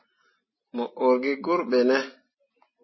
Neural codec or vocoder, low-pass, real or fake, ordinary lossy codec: none; 7.2 kHz; real; MP3, 24 kbps